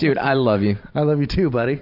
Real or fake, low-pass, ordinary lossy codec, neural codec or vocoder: fake; 5.4 kHz; AAC, 48 kbps; vocoder, 44.1 kHz, 128 mel bands every 512 samples, BigVGAN v2